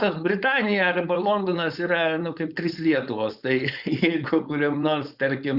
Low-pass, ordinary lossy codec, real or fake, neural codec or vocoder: 5.4 kHz; Opus, 64 kbps; fake; codec, 16 kHz, 4.8 kbps, FACodec